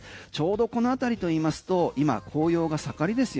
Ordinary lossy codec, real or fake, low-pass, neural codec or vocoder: none; real; none; none